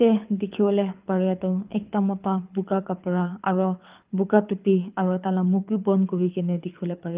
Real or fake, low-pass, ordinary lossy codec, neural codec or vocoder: fake; 3.6 kHz; Opus, 32 kbps; codec, 24 kHz, 6 kbps, HILCodec